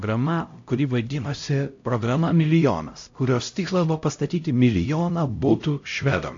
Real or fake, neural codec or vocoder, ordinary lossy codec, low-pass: fake; codec, 16 kHz, 0.5 kbps, X-Codec, HuBERT features, trained on LibriSpeech; AAC, 48 kbps; 7.2 kHz